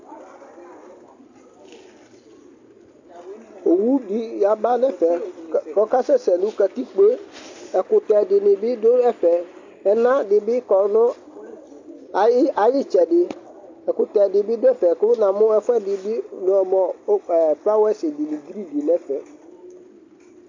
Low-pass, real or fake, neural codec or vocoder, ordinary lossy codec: 7.2 kHz; real; none; AAC, 48 kbps